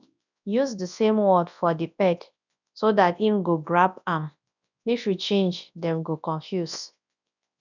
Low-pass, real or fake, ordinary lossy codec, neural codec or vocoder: 7.2 kHz; fake; none; codec, 24 kHz, 0.9 kbps, WavTokenizer, large speech release